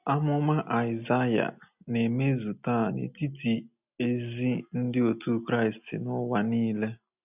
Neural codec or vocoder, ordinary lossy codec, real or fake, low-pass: none; none; real; 3.6 kHz